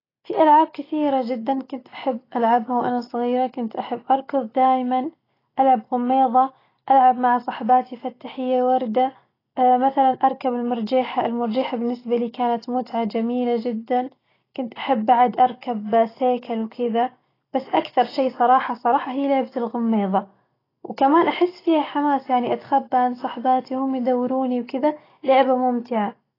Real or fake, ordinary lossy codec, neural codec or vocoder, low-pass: real; AAC, 24 kbps; none; 5.4 kHz